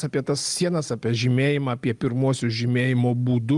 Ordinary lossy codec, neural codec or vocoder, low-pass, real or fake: Opus, 32 kbps; none; 10.8 kHz; real